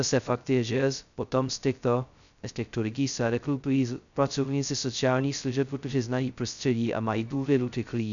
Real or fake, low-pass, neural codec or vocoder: fake; 7.2 kHz; codec, 16 kHz, 0.2 kbps, FocalCodec